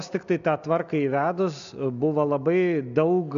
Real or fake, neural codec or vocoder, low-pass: real; none; 7.2 kHz